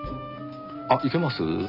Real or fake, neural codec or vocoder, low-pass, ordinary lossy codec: real; none; 5.4 kHz; none